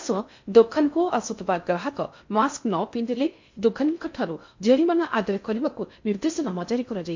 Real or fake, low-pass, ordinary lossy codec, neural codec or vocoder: fake; 7.2 kHz; MP3, 48 kbps; codec, 16 kHz in and 24 kHz out, 0.6 kbps, FocalCodec, streaming, 4096 codes